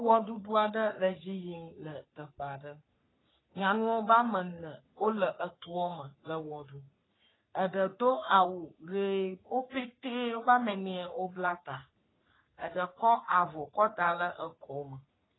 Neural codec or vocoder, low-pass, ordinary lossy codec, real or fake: codec, 44.1 kHz, 3.4 kbps, Pupu-Codec; 7.2 kHz; AAC, 16 kbps; fake